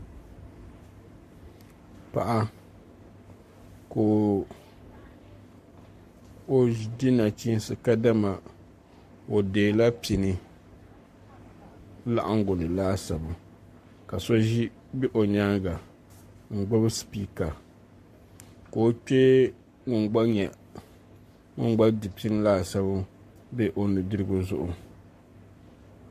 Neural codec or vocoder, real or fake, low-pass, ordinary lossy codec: codec, 44.1 kHz, 7.8 kbps, Pupu-Codec; fake; 14.4 kHz; MP3, 64 kbps